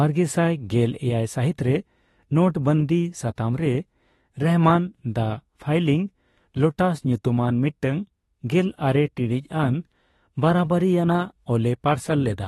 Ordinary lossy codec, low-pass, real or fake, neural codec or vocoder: AAC, 32 kbps; 19.8 kHz; fake; autoencoder, 48 kHz, 32 numbers a frame, DAC-VAE, trained on Japanese speech